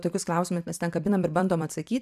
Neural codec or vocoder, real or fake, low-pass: vocoder, 44.1 kHz, 128 mel bands every 256 samples, BigVGAN v2; fake; 14.4 kHz